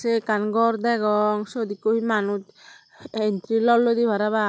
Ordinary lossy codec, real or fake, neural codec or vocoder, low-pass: none; real; none; none